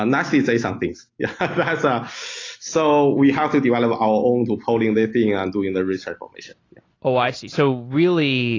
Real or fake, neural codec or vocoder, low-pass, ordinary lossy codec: real; none; 7.2 kHz; AAC, 32 kbps